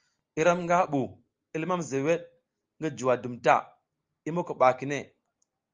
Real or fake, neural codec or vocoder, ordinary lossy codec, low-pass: real; none; Opus, 24 kbps; 7.2 kHz